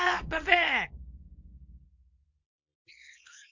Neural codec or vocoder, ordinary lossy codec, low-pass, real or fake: codec, 24 kHz, 0.9 kbps, WavTokenizer, small release; MP3, 48 kbps; 7.2 kHz; fake